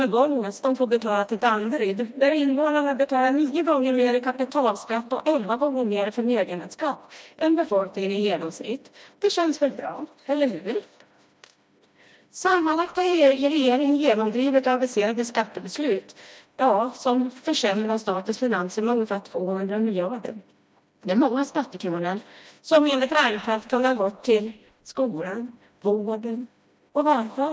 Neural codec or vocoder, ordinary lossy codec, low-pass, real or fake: codec, 16 kHz, 1 kbps, FreqCodec, smaller model; none; none; fake